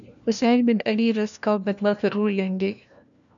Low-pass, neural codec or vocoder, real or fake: 7.2 kHz; codec, 16 kHz, 1 kbps, FunCodec, trained on LibriTTS, 50 frames a second; fake